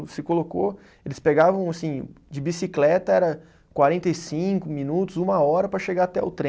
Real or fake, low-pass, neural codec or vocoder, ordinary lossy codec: real; none; none; none